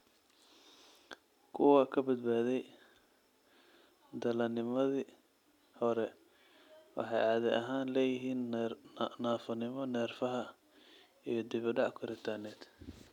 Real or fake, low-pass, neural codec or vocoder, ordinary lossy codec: real; 19.8 kHz; none; none